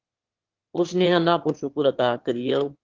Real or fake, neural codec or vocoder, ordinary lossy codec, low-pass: fake; autoencoder, 22.05 kHz, a latent of 192 numbers a frame, VITS, trained on one speaker; Opus, 16 kbps; 7.2 kHz